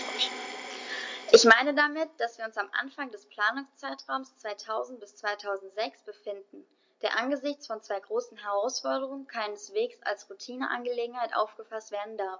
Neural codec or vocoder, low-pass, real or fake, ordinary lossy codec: none; 7.2 kHz; real; MP3, 48 kbps